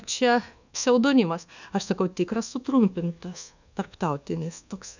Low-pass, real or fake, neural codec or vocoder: 7.2 kHz; fake; codec, 24 kHz, 1.2 kbps, DualCodec